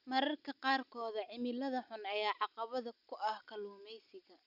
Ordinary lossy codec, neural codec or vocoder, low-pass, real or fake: none; none; 5.4 kHz; real